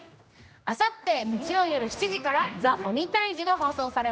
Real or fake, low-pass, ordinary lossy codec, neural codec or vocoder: fake; none; none; codec, 16 kHz, 1 kbps, X-Codec, HuBERT features, trained on general audio